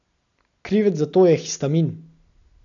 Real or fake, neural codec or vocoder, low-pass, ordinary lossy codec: real; none; 7.2 kHz; none